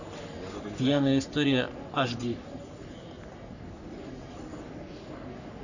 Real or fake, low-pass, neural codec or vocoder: fake; 7.2 kHz; codec, 44.1 kHz, 3.4 kbps, Pupu-Codec